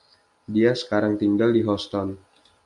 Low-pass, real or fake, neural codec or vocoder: 10.8 kHz; real; none